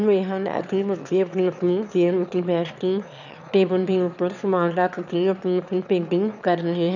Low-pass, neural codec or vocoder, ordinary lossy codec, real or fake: 7.2 kHz; autoencoder, 22.05 kHz, a latent of 192 numbers a frame, VITS, trained on one speaker; none; fake